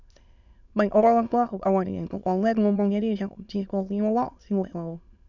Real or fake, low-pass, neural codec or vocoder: fake; 7.2 kHz; autoencoder, 22.05 kHz, a latent of 192 numbers a frame, VITS, trained on many speakers